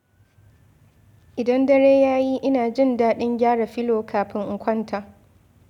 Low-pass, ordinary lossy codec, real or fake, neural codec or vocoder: 19.8 kHz; none; real; none